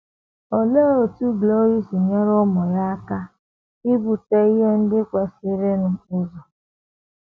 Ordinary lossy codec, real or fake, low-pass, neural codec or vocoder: none; real; none; none